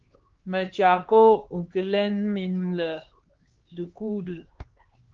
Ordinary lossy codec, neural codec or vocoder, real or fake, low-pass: Opus, 16 kbps; codec, 16 kHz, 2 kbps, X-Codec, HuBERT features, trained on LibriSpeech; fake; 7.2 kHz